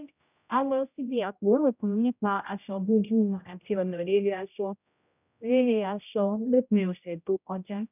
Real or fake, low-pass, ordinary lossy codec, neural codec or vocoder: fake; 3.6 kHz; none; codec, 16 kHz, 0.5 kbps, X-Codec, HuBERT features, trained on general audio